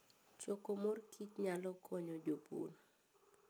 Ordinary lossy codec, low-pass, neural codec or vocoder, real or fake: none; none; none; real